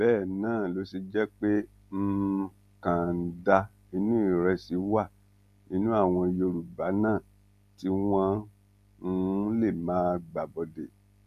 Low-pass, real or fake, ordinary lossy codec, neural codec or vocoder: 14.4 kHz; real; none; none